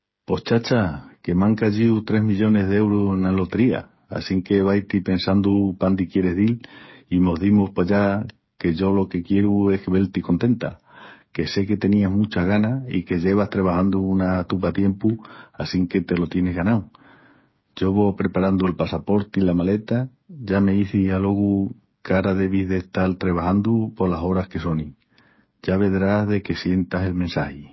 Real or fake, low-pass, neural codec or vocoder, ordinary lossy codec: fake; 7.2 kHz; codec, 16 kHz, 8 kbps, FreqCodec, smaller model; MP3, 24 kbps